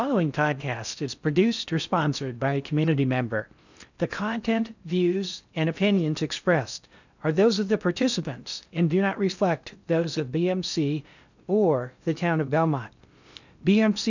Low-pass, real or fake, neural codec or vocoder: 7.2 kHz; fake; codec, 16 kHz in and 24 kHz out, 0.6 kbps, FocalCodec, streaming, 4096 codes